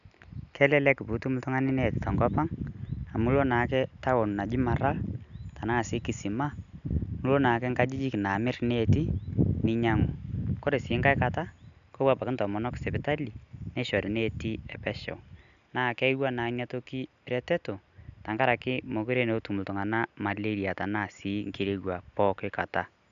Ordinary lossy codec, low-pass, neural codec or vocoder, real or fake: none; 7.2 kHz; none; real